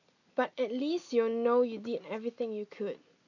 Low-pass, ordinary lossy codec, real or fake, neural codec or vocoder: 7.2 kHz; none; real; none